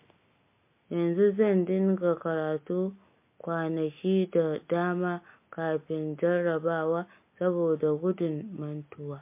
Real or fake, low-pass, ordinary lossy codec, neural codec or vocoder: real; 3.6 kHz; MP3, 24 kbps; none